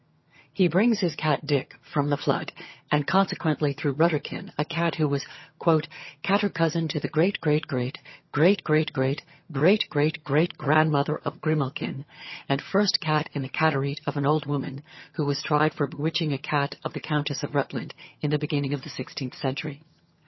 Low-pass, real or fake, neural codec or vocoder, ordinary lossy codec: 7.2 kHz; fake; vocoder, 22.05 kHz, 80 mel bands, HiFi-GAN; MP3, 24 kbps